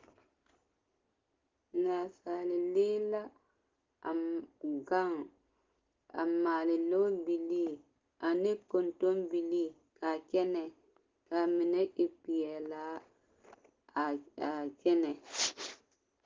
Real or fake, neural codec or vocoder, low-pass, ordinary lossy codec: real; none; 7.2 kHz; Opus, 16 kbps